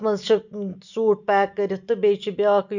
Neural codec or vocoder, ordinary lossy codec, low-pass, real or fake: none; none; 7.2 kHz; real